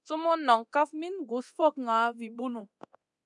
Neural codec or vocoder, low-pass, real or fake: codec, 24 kHz, 0.9 kbps, DualCodec; 10.8 kHz; fake